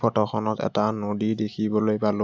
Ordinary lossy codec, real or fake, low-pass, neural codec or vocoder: none; real; none; none